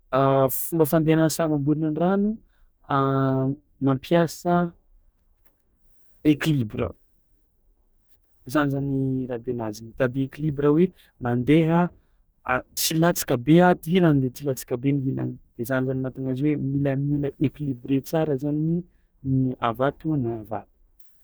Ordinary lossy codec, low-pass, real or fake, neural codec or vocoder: none; none; fake; codec, 44.1 kHz, 2.6 kbps, DAC